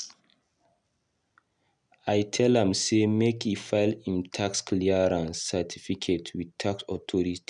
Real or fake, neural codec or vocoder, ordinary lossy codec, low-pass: real; none; none; 10.8 kHz